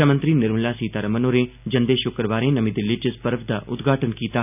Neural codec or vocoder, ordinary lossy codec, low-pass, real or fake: none; none; 3.6 kHz; real